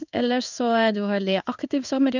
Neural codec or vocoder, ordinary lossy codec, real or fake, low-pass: codec, 16 kHz in and 24 kHz out, 1 kbps, XY-Tokenizer; none; fake; 7.2 kHz